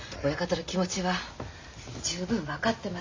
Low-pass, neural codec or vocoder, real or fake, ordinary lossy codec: 7.2 kHz; none; real; MP3, 64 kbps